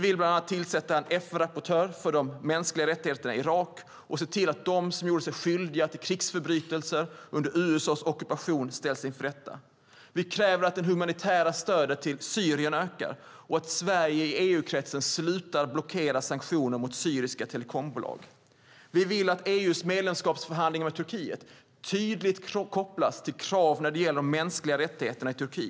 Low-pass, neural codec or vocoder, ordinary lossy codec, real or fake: none; none; none; real